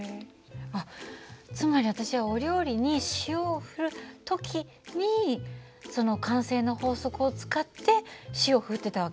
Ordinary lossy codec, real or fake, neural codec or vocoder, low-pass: none; real; none; none